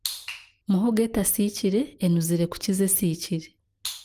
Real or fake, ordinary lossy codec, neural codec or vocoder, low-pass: real; Opus, 24 kbps; none; 14.4 kHz